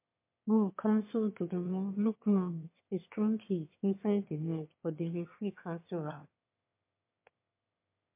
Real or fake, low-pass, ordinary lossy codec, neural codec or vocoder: fake; 3.6 kHz; MP3, 24 kbps; autoencoder, 22.05 kHz, a latent of 192 numbers a frame, VITS, trained on one speaker